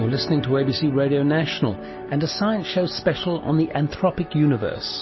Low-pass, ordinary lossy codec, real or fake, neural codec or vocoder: 7.2 kHz; MP3, 24 kbps; real; none